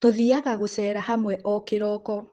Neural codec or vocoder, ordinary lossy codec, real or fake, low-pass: vocoder, 44.1 kHz, 128 mel bands, Pupu-Vocoder; Opus, 16 kbps; fake; 19.8 kHz